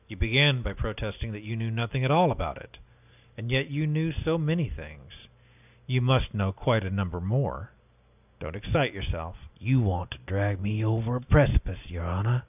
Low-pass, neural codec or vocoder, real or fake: 3.6 kHz; none; real